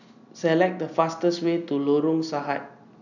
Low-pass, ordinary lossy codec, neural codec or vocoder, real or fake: 7.2 kHz; none; none; real